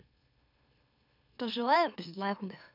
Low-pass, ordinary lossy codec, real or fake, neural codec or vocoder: 5.4 kHz; none; fake; autoencoder, 44.1 kHz, a latent of 192 numbers a frame, MeloTTS